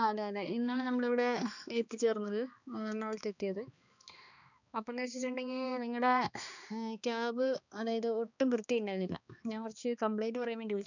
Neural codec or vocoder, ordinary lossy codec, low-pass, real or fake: codec, 16 kHz, 2 kbps, X-Codec, HuBERT features, trained on balanced general audio; none; 7.2 kHz; fake